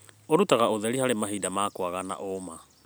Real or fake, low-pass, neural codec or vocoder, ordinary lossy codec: real; none; none; none